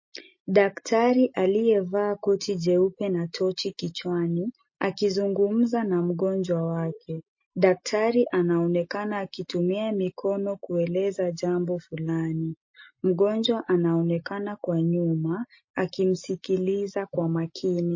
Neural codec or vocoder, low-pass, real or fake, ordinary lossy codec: none; 7.2 kHz; real; MP3, 32 kbps